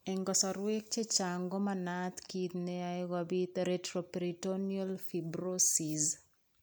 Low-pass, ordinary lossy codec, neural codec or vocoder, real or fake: none; none; none; real